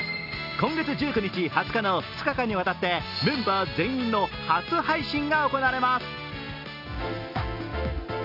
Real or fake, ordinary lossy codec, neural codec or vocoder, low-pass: real; none; none; 5.4 kHz